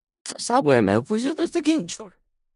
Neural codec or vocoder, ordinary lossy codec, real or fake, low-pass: codec, 16 kHz in and 24 kHz out, 0.4 kbps, LongCat-Audio-Codec, four codebook decoder; none; fake; 10.8 kHz